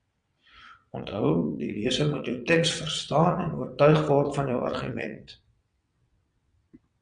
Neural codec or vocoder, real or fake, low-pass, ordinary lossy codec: vocoder, 22.05 kHz, 80 mel bands, WaveNeXt; fake; 9.9 kHz; Opus, 64 kbps